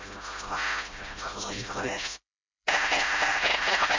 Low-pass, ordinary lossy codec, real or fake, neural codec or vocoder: 7.2 kHz; MP3, 48 kbps; fake; codec, 16 kHz, 0.5 kbps, FreqCodec, smaller model